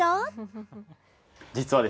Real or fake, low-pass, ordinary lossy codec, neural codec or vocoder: real; none; none; none